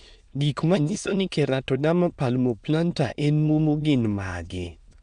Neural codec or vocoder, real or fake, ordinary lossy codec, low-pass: autoencoder, 22.05 kHz, a latent of 192 numbers a frame, VITS, trained on many speakers; fake; none; 9.9 kHz